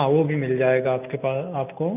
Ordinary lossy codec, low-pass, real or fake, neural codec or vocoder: AAC, 32 kbps; 3.6 kHz; real; none